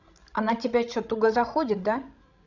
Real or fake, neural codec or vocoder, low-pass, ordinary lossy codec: fake; codec, 16 kHz, 16 kbps, FreqCodec, larger model; 7.2 kHz; none